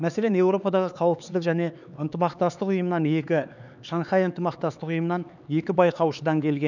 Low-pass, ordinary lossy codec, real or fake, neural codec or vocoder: 7.2 kHz; none; fake; codec, 16 kHz, 4 kbps, X-Codec, HuBERT features, trained on LibriSpeech